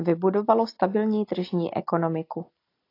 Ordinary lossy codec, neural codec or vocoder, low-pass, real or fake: AAC, 32 kbps; none; 5.4 kHz; real